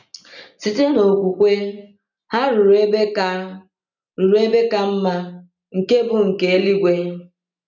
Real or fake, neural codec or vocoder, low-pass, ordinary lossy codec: real; none; 7.2 kHz; none